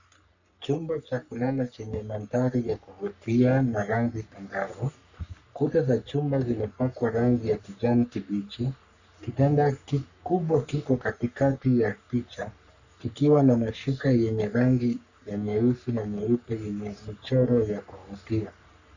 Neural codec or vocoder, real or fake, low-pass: codec, 44.1 kHz, 3.4 kbps, Pupu-Codec; fake; 7.2 kHz